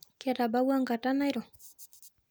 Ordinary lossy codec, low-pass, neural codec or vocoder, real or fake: none; none; none; real